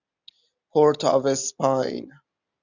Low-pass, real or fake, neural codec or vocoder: 7.2 kHz; real; none